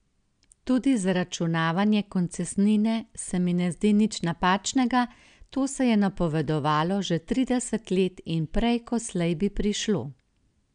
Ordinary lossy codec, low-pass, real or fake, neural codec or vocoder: none; 9.9 kHz; real; none